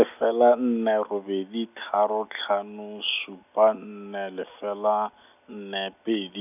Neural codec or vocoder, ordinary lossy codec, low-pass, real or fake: none; none; 3.6 kHz; real